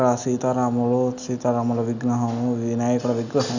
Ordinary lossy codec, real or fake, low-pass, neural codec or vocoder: none; real; 7.2 kHz; none